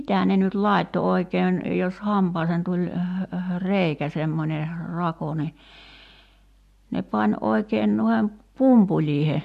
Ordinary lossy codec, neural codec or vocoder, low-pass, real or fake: MP3, 64 kbps; none; 14.4 kHz; real